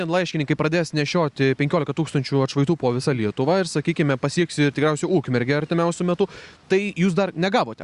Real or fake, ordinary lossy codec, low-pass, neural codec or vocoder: real; Opus, 64 kbps; 10.8 kHz; none